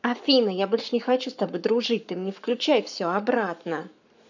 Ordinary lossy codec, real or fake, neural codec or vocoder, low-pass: none; fake; codec, 44.1 kHz, 7.8 kbps, Pupu-Codec; 7.2 kHz